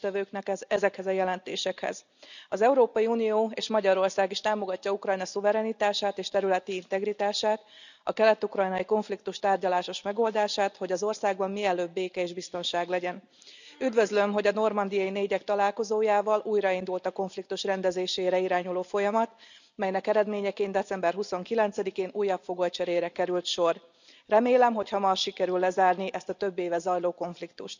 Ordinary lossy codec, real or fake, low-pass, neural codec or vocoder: none; real; 7.2 kHz; none